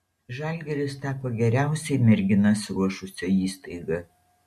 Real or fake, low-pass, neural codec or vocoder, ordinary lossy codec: real; 14.4 kHz; none; MP3, 64 kbps